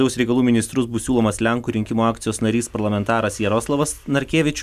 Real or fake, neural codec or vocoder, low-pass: real; none; 14.4 kHz